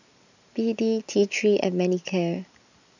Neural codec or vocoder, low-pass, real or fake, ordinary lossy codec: none; 7.2 kHz; real; none